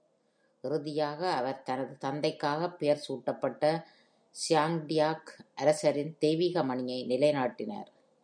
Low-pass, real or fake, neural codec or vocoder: 9.9 kHz; real; none